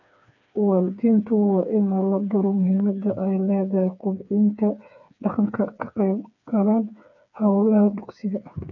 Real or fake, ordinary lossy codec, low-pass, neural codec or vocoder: fake; none; 7.2 kHz; codec, 16 kHz, 4 kbps, FreqCodec, smaller model